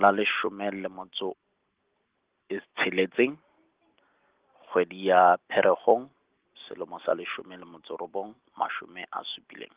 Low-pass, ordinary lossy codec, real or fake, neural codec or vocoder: 3.6 kHz; Opus, 16 kbps; real; none